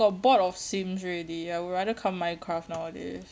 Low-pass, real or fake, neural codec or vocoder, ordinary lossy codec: none; real; none; none